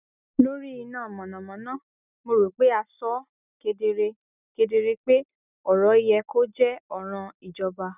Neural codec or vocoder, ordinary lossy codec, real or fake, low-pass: none; none; real; 3.6 kHz